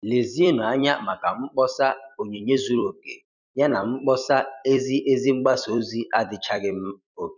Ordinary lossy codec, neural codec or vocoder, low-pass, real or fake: none; vocoder, 44.1 kHz, 128 mel bands every 256 samples, BigVGAN v2; 7.2 kHz; fake